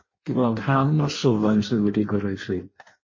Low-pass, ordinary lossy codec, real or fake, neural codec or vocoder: 7.2 kHz; MP3, 32 kbps; fake; codec, 16 kHz in and 24 kHz out, 0.6 kbps, FireRedTTS-2 codec